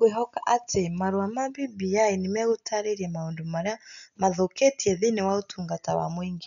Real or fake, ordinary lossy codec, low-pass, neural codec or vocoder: real; none; 7.2 kHz; none